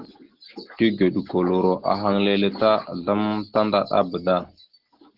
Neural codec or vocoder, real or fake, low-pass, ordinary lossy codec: none; real; 5.4 kHz; Opus, 16 kbps